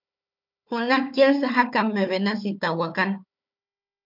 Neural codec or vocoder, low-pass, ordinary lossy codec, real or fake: codec, 16 kHz, 4 kbps, FunCodec, trained on Chinese and English, 50 frames a second; 5.4 kHz; MP3, 48 kbps; fake